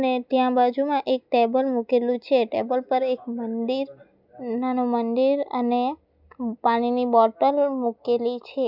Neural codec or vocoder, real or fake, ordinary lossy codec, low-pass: none; real; none; 5.4 kHz